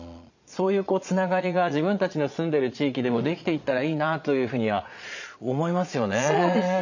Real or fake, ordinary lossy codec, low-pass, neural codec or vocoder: fake; none; 7.2 kHz; vocoder, 22.05 kHz, 80 mel bands, Vocos